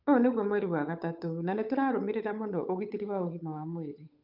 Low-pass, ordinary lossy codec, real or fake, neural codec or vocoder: 5.4 kHz; none; fake; codec, 16 kHz, 8 kbps, FunCodec, trained on Chinese and English, 25 frames a second